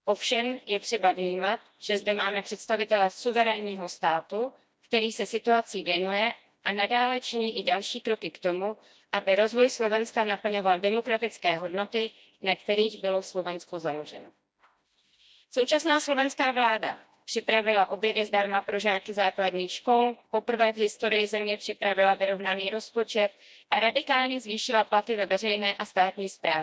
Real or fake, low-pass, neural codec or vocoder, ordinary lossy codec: fake; none; codec, 16 kHz, 1 kbps, FreqCodec, smaller model; none